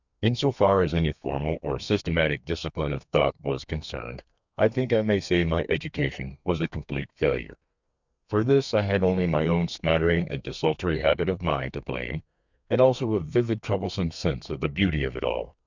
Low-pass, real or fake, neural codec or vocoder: 7.2 kHz; fake; codec, 32 kHz, 1.9 kbps, SNAC